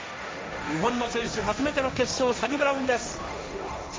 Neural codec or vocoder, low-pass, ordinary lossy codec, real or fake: codec, 16 kHz, 1.1 kbps, Voila-Tokenizer; none; none; fake